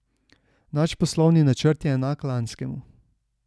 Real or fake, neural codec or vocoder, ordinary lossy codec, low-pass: real; none; none; none